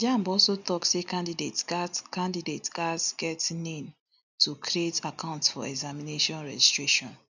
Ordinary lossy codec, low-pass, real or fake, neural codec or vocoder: none; 7.2 kHz; real; none